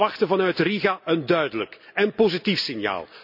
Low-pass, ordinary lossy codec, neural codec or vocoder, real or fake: 5.4 kHz; none; none; real